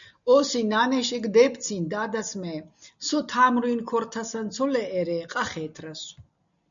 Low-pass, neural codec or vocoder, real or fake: 7.2 kHz; none; real